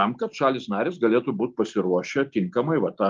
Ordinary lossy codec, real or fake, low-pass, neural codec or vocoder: Opus, 32 kbps; real; 7.2 kHz; none